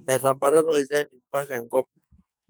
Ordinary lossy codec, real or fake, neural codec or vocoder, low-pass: none; fake; codec, 44.1 kHz, 2.6 kbps, SNAC; none